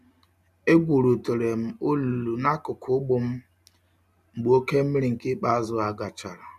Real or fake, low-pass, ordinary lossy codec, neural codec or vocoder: real; 14.4 kHz; none; none